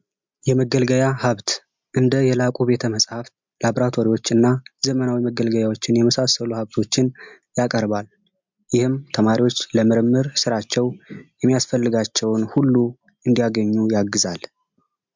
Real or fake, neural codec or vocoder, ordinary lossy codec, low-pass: real; none; MP3, 64 kbps; 7.2 kHz